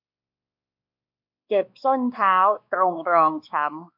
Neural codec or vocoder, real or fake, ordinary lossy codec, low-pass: codec, 16 kHz, 2 kbps, X-Codec, WavLM features, trained on Multilingual LibriSpeech; fake; MP3, 48 kbps; 5.4 kHz